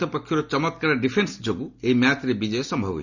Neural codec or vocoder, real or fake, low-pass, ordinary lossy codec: none; real; 7.2 kHz; none